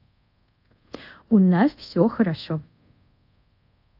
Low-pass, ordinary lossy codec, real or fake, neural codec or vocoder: 5.4 kHz; AAC, 48 kbps; fake; codec, 24 kHz, 0.5 kbps, DualCodec